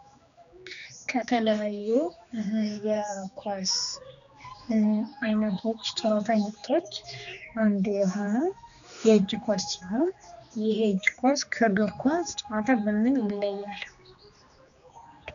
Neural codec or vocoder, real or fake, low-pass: codec, 16 kHz, 2 kbps, X-Codec, HuBERT features, trained on general audio; fake; 7.2 kHz